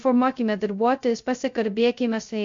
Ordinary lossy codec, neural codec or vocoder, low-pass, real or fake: MP3, 48 kbps; codec, 16 kHz, 0.2 kbps, FocalCodec; 7.2 kHz; fake